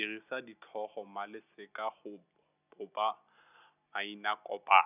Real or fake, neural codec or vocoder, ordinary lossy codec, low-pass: real; none; none; 3.6 kHz